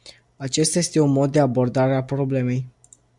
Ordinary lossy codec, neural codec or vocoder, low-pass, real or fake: MP3, 96 kbps; none; 10.8 kHz; real